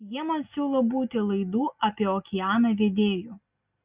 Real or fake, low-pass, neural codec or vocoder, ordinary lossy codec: real; 3.6 kHz; none; Opus, 64 kbps